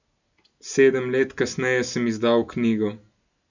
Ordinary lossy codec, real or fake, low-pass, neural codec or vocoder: none; real; 7.2 kHz; none